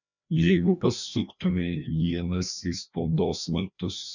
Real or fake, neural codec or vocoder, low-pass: fake; codec, 16 kHz, 1 kbps, FreqCodec, larger model; 7.2 kHz